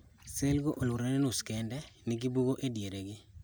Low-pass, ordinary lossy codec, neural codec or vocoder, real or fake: none; none; none; real